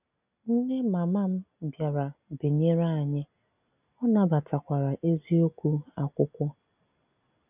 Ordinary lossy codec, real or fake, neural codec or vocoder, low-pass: none; real; none; 3.6 kHz